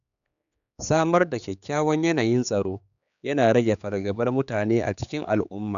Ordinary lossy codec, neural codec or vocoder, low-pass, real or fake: none; codec, 16 kHz, 4 kbps, X-Codec, HuBERT features, trained on general audio; 7.2 kHz; fake